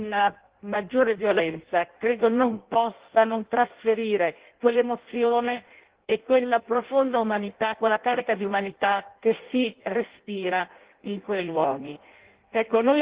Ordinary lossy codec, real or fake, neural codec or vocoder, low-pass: Opus, 16 kbps; fake; codec, 16 kHz in and 24 kHz out, 0.6 kbps, FireRedTTS-2 codec; 3.6 kHz